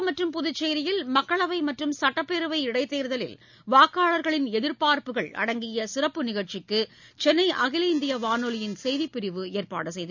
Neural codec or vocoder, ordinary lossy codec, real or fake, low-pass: none; none; real; 7.2 kHz